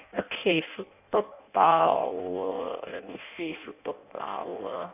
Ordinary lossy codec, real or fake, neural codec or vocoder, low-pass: none; fake; codec, 16 kHz in and 24 kHz out, 0.6 kbps, FireRedTTS-2 codec; 3.6 kHz